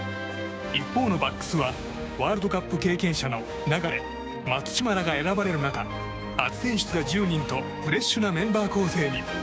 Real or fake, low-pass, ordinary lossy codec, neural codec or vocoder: fake; none; none; codec, 16 kHz, 6 kbps, DAC